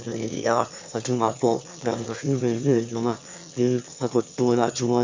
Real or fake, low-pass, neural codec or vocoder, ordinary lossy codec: fake; 7.2 kHz; autoencoder, 22.05 kHz, a latent of 192 numbers a frame, VITS, trained on one speaker; MP3, 64 kbps